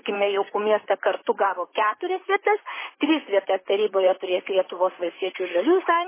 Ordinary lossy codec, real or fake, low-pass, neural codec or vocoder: MP3, 16 kbps; fake; 3.6 kHz; vocoder, 44.1 kHz, 128 mel bands, Pupu-Vocoder